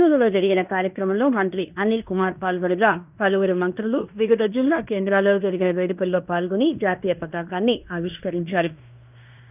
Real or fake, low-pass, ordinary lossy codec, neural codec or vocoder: fake; 3.6 kHz; none; codec, 16 kHz in and 24 kHz out, 0.9 kbps, LongCat-Audio-Codec, fine tuned four codebook decoder